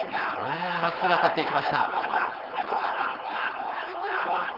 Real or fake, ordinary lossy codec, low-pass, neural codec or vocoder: fake; Opus, 32 kbps; 5.4 kHz; codec, 16 kHz, 4.8 kbps, FACodec